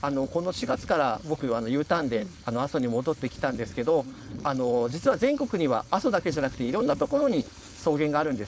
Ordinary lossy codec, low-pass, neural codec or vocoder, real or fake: none; none; codec, 16 kHz, 4.8 kbps, FACodec; fake